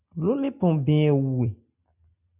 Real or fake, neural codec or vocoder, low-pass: real; none; 3.6 kHz